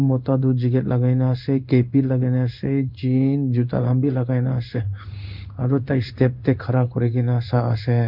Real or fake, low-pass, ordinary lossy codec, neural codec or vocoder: fake; 5.4 kHz; none; codec, 16 kHz in and 24 kHz out, 1 kbps, XY-Tokenizer